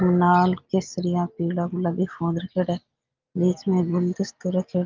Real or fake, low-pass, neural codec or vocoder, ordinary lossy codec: real; 7.2 kHz; none; Opus, 24 kbps